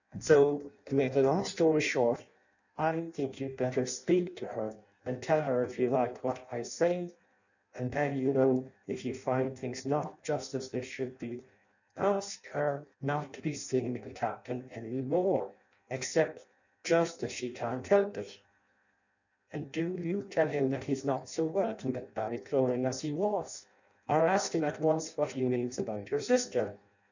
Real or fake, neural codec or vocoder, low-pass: fake; codec, 16 kHz in and 24 kHz out, 0.6 kbps, FireRedTTS-2 codec; 7.2 kHz